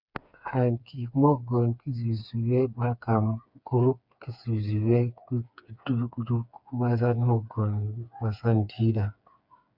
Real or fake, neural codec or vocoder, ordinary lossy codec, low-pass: fake; codec, 16 kHz, 4 kbps, FreqCodec, smaller model; Opus, 64 kbps; 5.4 kHz